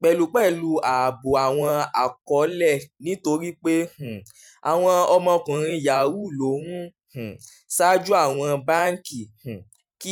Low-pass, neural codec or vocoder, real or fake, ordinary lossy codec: 19.8 kHz; vocoder, 44.1 kHz, 128 mel bands every 512 samples, BigVGAN v2; fake; none